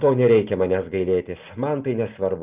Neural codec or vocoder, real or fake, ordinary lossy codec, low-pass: none; real; Opus, 32 kbps; 3.6 kHz